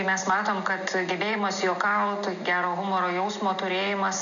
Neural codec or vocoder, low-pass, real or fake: none; 7.2 kHz; real